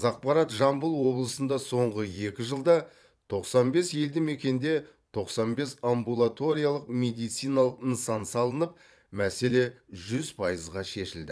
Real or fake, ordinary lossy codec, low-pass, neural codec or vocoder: fake; none; none; vocoder, 22.05 kHz, 80 mel bands, Vocos